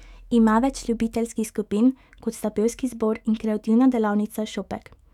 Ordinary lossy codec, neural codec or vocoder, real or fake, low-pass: none; autoencoder, 48 kHz, 128 numbers a frame, DAC-VAE, trained on Japanese speech; fake; 19.8 kHz